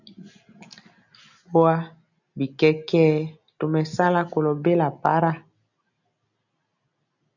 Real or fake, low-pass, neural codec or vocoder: real; 7.2 kHz; none